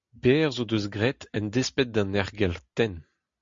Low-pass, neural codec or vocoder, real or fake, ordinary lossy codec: 7.2 kHz; none; real; MP3, 48 kbps